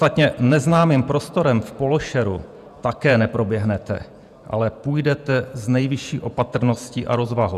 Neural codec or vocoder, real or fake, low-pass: vocoder, 48 kHz, 128 mel bands, Vocos; fake; 14.4 kHz